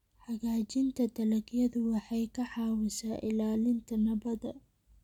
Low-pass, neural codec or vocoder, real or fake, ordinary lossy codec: 19.8 kHz; none; real; none